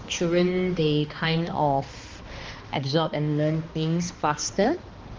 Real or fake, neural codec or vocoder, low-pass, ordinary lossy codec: fake; codec, 16 kHz, 2 kbps, X-Codec, HuBERT features, trained on balanced general audio; 7.2 kHz; Opus, 24 kbps